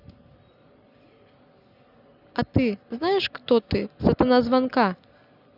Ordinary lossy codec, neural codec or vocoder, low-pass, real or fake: none; none; 5.4 kHz; real